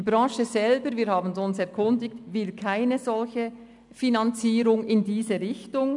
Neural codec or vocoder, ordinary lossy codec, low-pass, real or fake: none; none; 10.8 kHz; real